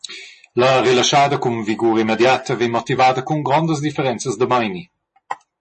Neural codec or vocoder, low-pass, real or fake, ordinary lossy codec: none; 10.8 kHz; real; MP3, 32 kbps